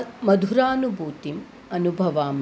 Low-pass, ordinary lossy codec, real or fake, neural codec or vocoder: none; none; real; none